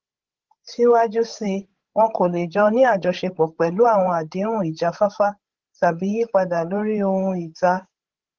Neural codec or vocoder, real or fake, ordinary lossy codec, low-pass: codec, 16 kHz, 16 kbps, FreqCodec, larger model; fake; Opus, 16 kbps; 7.2 kHz